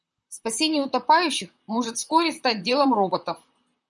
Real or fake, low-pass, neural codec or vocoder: fake; 10.8 kHz; vocoder, 44.1 kHz, 128 mel bands, Pupu-Vocoder